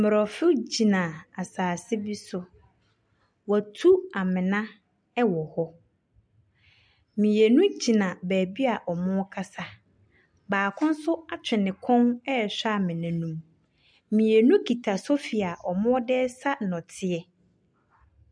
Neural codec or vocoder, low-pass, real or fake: none; 9.9 kHz; real